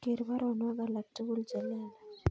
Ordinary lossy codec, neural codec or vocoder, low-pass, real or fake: none; none; none; real